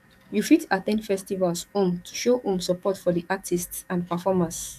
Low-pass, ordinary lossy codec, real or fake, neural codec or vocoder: 14.4 kHz; none; fake; codec, 44.1 kHz, 7.8 kbps, DAC